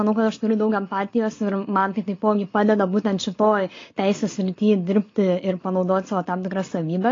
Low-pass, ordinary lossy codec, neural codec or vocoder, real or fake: 7.2 kHz; AAC, 32 kbps; none; real